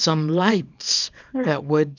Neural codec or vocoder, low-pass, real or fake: codec, 24 kHz, 0.9 kbps, WavTokenizer, small release; 7.2 kHz; fake